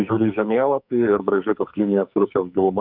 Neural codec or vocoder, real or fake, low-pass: codec, 44.1 kHz, 2.6 kbps, SNAC; fake; 5.4 kHz